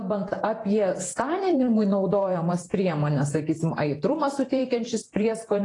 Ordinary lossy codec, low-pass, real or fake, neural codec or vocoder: AAC, 32 kbps; 10.8 kHz; fake; vocoder, 48 kHz, 128 mel bands, Vocos